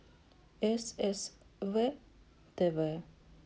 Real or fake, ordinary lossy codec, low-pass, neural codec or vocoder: real; none; none; none